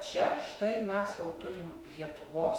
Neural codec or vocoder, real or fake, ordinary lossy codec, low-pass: autoencoder, 48 kHz, 32 numbers a frame, DAC-VAE, trained on Japanese speech; fake; Opus, 64 kbps; 19.8 kHz